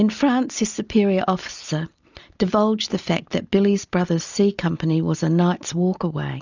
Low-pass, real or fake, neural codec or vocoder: 7.2 kHz; real; none